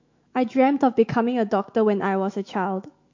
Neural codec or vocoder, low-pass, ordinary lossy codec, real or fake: autoencoder, 48 kHz, 128 numbers a frame, DAC-VAE, trained on Japanese speech; 7.2 kHz; MP3, 48 kbps; fake